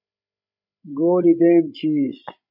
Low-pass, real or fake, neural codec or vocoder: 5.4 kHz; fake; codec, 16 kHz, 16 kbps, FreqCodec, larger model